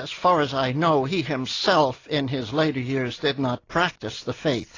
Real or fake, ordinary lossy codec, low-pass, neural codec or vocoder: real; AAC, 32 kbps; 7.2 kHz; none